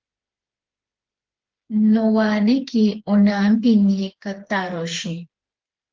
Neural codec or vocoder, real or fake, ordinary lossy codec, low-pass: codec, 16 kHz, 4 kbps, FreqCodec, smaller model; fake; Opus, 16 kbps; 7.2 kHz